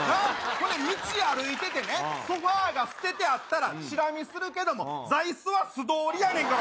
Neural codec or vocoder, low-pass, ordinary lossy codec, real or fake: none; none; none; real